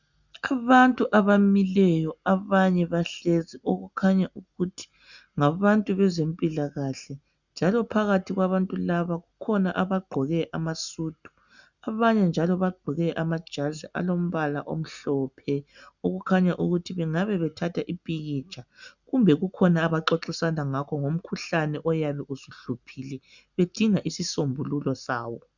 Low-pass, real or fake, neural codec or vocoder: 7.2 kHz; real; none